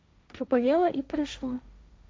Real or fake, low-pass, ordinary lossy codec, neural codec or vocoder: fake; none; none; codec, 16 kHz, 1.1 kbps, Voila-Tokenizer